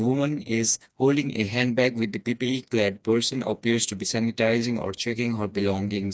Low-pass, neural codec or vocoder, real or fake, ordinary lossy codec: none; codec, 16 kHz, 2 kbps, FreqCodec, smaller model; fake; none